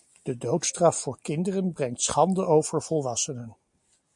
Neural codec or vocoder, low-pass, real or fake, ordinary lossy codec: none; 10.8 kHz; real; MP3, 64 kbps